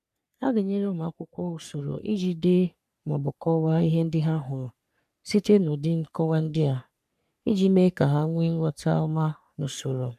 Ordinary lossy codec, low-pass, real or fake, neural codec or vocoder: none; 14.4 kHz; fake; codec, 44.1 kHz, 3.4 kbps, Pupu-Codec